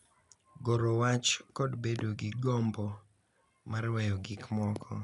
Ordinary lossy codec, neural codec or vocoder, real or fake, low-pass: none; none; real; 10.8 kHz